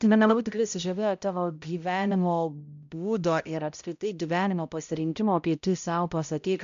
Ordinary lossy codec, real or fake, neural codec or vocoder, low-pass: MP3, 64 kbps; fake; codec, 16 kHz, 0.5 kbps, X-Codec, HuBERT features, trained on balanced general audio; 7.2 kHz